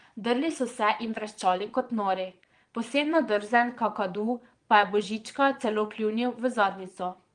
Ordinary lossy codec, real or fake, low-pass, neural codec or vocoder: Opus, 24 kbps; fake; 9.9 kHz; vocoder, 22.05 kHz, 80 mel bands, Vocos